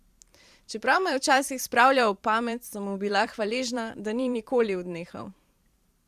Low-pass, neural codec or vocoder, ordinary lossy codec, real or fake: 14.4 kHz; vocoder, 44.1 kHz, 128 mel bands every 512 samples, BigVGAN v2; Opus, 64 kbps; fake